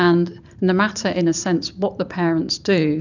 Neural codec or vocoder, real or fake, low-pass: vocoder, 44.1 kHz, 128 mel bands every 512 samples, BigVGAN v2; fake; 7.2 kHz